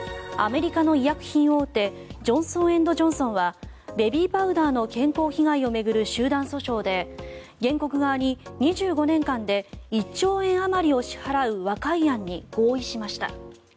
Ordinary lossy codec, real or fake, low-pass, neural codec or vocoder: none; real; none; none